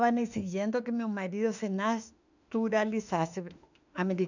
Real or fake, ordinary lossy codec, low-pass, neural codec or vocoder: fake; none; 7.2 kHz; autoencoder, 48 kHz, 32 numbers a frame, DAC-VAE, trained on Japanese speech